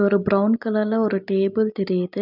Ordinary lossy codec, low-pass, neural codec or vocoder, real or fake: none; 5.4 kHz; none; real